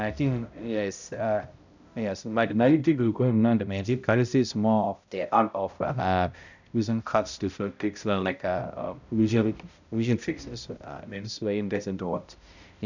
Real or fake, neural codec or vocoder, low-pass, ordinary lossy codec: fake; codec, 16 kHz, 0.5 kbps, X-Codec, HuBERT features, trained on balanced general audio; 7.2 kHz; none